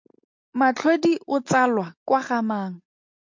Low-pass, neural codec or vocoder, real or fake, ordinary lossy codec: 7.2 kHz; none; real; MP3, 48 kbps